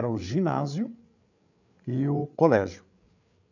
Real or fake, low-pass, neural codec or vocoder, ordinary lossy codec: fake; 7.2 kHz; codec, 16 kHz, 8 kbps, FreqCodec, larger model; none